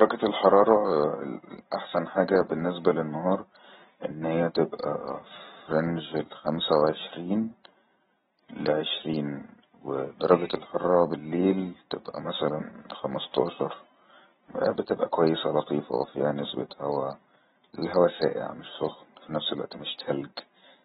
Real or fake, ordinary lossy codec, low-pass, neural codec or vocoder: real; AAC, 16 kbps; 7.2 kHz; none